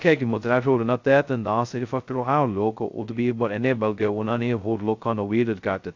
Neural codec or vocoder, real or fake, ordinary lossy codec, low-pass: codec, 16 kHz, 0.2 kbps, FocalCodec; fake; AAC, 48 kbps; 7.2 kHz